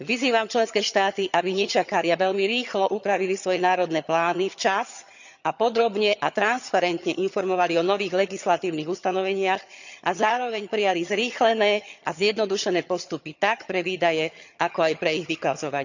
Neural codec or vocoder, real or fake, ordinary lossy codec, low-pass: vocoder, 22.05 kHz, 80 mel bands, HiFi-GAN; fake; none; 7.2 kHz